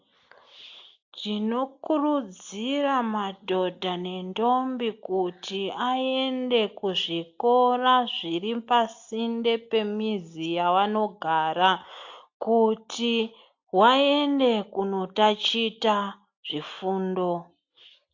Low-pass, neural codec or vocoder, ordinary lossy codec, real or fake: 7.2 kHz; none; AAC, 48 kbps; real